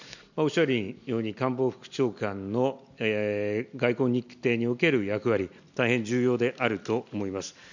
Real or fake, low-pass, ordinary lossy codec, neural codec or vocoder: real; 7.2 kHz; none; none